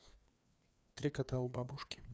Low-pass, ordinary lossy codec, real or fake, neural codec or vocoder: none; none; fake; codec, 16 kHz, 2 kbps, FreqCodec, larger model